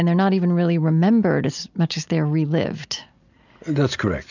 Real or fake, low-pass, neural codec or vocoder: real; 7.2 kHz; none